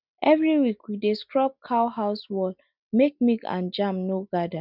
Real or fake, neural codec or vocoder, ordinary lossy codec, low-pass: real; none; none; 5.4 kHz